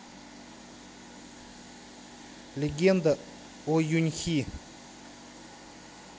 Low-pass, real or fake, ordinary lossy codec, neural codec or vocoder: none; real; none; none